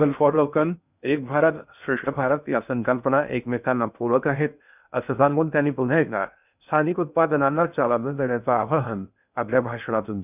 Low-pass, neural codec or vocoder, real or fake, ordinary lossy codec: 3.6 kHz; codec, 16 kHz in and 24 kHz out, 0.6 kbps, FocalCodec, streaming, 2048 codes; fake; none